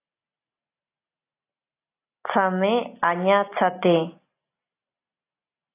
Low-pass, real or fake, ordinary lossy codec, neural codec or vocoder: 3.6 kHz; real; AAC, 24 kbps; none